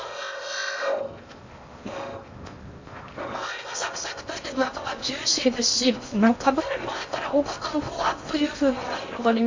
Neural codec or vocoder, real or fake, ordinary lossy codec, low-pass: codec, 16 kHz in and 24 kHz out, 0.6 kbps, FocalCodec, streaming, 4096 codes; fake; MP3, 48 kbps; 7.2 kHz